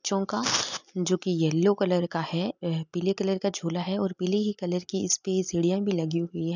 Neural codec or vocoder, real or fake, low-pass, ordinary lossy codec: none; real; 7.2 kHz; none